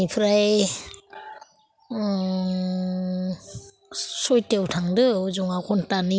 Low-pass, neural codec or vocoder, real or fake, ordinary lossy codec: none; none; real; none